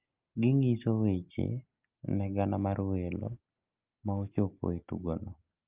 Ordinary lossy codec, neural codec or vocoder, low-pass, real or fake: Opus, 32 kbps; none; 3.6 kHz; real